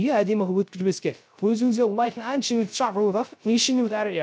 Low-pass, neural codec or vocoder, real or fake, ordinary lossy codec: none; codec, 16 kHz, 0.3 kbps, FocalCodec; fake; none